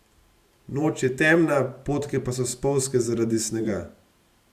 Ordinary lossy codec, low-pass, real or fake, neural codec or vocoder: Opus, 64 kbps; 14.4 kHz; fake; vocoder, 44.1 kHz, 128 mel bands every 512 samples, BigVGAN v2